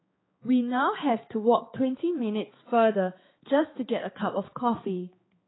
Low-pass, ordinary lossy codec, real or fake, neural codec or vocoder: 7.2 kHz; AAC, 16 kbps; fake; codec, 16 kHz, 4 kbps, X-Codec, HuBERT features, trained on balanced general audio